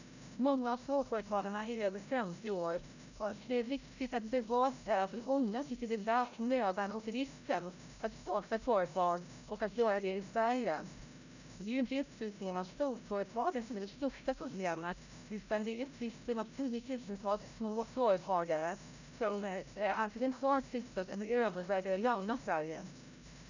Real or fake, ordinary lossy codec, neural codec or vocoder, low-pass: fake; none; codec, 16 kHz, 0.5 kbps, FreqCodec, larger model; 7.2 kHz